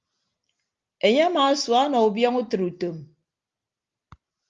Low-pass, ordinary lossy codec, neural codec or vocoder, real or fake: 7.2 kHz; Opus, 32 kbps; none; real